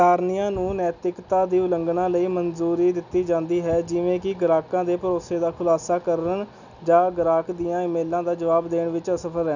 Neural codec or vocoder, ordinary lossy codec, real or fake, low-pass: none; none; real; 7.2 kHz